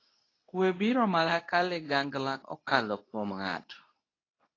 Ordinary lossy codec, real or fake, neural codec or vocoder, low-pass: AAC, 32 kbps; fake; codec, 24 kHz, 0.9 kbps, WavTokenizer, medium speech release version 2; 7.2 kHz